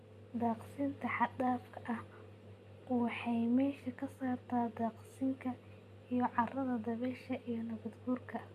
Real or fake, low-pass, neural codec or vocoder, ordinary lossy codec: real; 14.4 kHz; none; MP3, 96 kbps